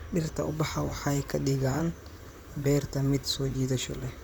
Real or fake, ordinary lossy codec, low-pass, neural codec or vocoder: fake; none; none; vocoder, 44.1 kHz, 128 mel bands, Pupu-Vocoder